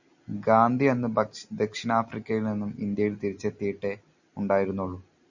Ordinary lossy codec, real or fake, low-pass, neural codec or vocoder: Opus, 64 kbps; real; 7.2 kHz; none